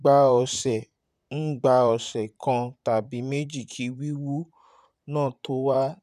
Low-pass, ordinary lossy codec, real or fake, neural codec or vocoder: 14.4 kHz; none; fake; vocoder, 44.1 kHz, 128 mel bands, Pupu-Vocoder